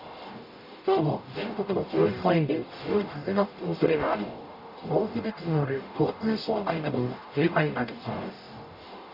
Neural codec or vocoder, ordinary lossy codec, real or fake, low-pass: codec, 44.1 kHz, 0.9 kbps, DAC; none; fake; 5.4 kHz